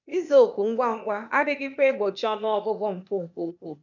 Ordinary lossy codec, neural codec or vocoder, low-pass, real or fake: none; codec, 16 kHz, 0.8 kbps, ZipCodec; 7.2 kHz; fake